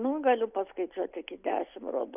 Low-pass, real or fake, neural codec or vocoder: 3.6 kHz; real; none